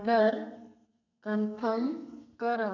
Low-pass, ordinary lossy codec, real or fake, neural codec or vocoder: 7.2 kHz; none; fake; codec, 32 kHz, 1.9 kbps, SNAC